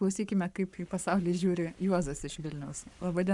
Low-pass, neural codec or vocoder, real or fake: 10.8 kHz; codec, 44.1 kHz, 7.8 kbps, Pupu-Codec; fake